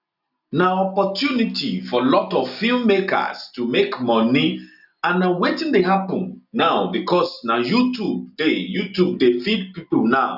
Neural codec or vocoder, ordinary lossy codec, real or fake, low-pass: none; none; real; 5.4 kHz